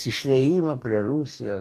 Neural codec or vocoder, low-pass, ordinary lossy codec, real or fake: codec, 44.1 kHz, 2.6 kbps, DAC; 14.4 kHz; MP3, 64 kbps; fake